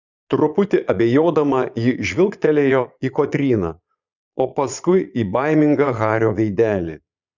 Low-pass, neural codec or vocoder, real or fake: 7.2 kHz; vocoder, 22.05 kHz, 80 mel bands, Vocos; fake